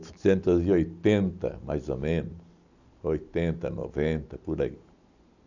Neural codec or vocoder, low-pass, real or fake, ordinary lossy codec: none; 7.2 kHz; real; none